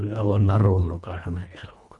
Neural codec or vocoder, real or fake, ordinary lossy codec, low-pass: codec, 24 kHz, 1.5 kbps, HILCodec; fake; none; 10.8 kHz